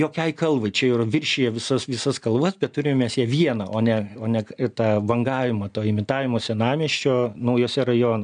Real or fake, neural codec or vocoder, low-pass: real; none; 9.9 kHz